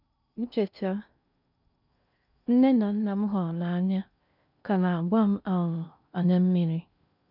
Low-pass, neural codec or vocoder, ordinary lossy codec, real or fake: 5.4 kHz; codec, 16 kHz in and 24 kHz out, 0.6 kbps, FocalCodec, streaming, 2048 codes; none; fake